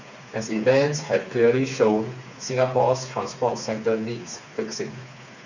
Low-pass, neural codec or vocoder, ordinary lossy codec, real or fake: 7.2 kHz; codec, 16 kHz, 4 kbps, FreqCodec, smaller model; none; fake